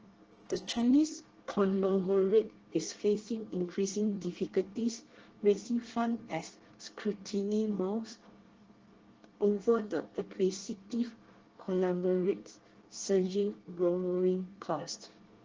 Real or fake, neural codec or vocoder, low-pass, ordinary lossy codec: fake; codec, 24 kHz, 1 kbps, SNAC; 7.2 kHz; Opus, 16 kbps